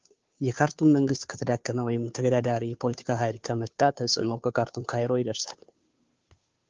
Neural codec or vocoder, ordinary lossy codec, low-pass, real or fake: codec, 16 kHz, 2 kbps, FunCodec, trained on Chinese and English, 25 frames a second; Opus, 32 kbps; 7.2 kHz; fake